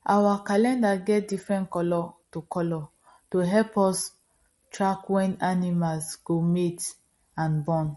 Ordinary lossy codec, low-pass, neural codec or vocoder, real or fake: MP3, 48 kbps; 19.8 kHz; none; real